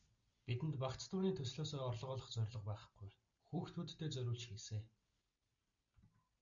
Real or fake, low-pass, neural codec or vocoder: real; 7.2 kHz; none